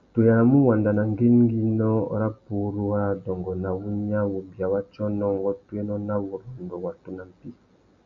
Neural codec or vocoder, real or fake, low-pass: none; real; 7.2 kHz